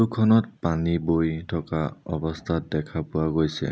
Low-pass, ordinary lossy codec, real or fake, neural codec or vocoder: none; none; real; none